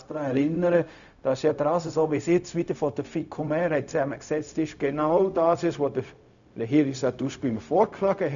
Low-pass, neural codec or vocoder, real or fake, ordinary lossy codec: 7.2 kHz; codec, 16 kHz, 0.4 kbps, LongCat-Audio-Codec; fake; none